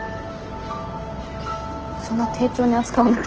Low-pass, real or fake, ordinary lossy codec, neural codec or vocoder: 7.2 kHz; real; Opus, 16 kbps; none